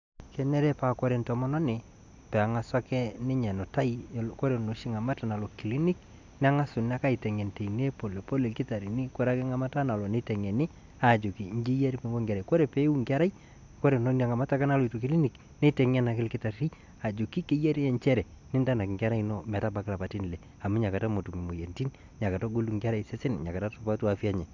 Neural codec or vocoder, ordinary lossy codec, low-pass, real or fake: none; MP3, 64 kbps; 7.2 kHz; real